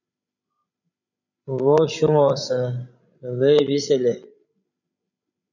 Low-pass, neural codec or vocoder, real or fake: 7.2 kHz; codec, 16 kHz, 16 kbps, FreqCodec, larger model; fake